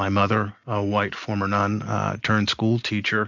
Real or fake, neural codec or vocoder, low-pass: real; none; 7.2 kHz